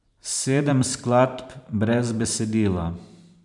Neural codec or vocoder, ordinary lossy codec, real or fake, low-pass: vocoder, 44.1 kHz, 128 mel bands every 512 samples, BigVGAN v2; none; fake; 10.8 kHz